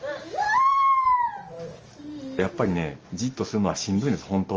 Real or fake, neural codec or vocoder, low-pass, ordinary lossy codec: real; none; 7.2 kHz; Opus, 24 kbps